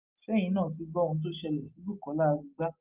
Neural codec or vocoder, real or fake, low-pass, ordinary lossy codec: none; real; 3.6 kHz; Opus, 24 kbps